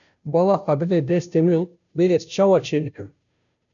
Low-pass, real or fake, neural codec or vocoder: 7.2 kHz; fake; codec, 16 kHz, 0.5 kbps, FunCodec, trained on Chinese and English, 25 frames a second